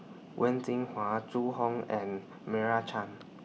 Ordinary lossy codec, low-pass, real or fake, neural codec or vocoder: none; none; real; none